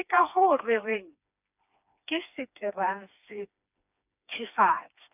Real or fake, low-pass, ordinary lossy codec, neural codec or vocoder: fake; 3.6 kHz; none; codec, 16 kHz, 2 kbps, FreqCodec, smaller model